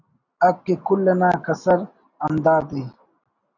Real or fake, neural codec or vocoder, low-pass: real; none; 7.2 kHz